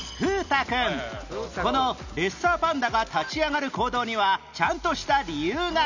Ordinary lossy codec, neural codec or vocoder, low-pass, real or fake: none; none; 7.2 kHz; real